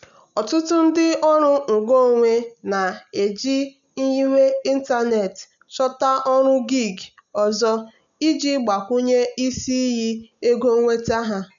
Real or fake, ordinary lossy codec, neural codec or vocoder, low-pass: real; none; none; 7.2 kHz